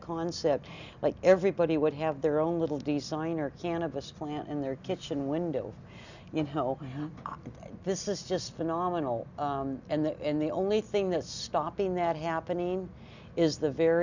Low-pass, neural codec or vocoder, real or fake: 7.2 kHz; none; real